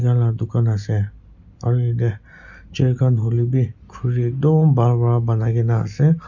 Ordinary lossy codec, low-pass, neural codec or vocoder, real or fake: none; 7.2 kHz; none; real